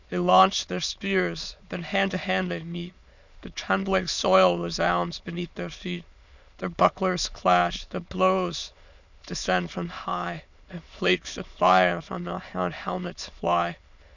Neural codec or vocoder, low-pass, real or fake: autoencoder, 22.05 kHz, a latent of 192 numbers a frame, VITS, trained on many speakers; 7.2 kHz; fake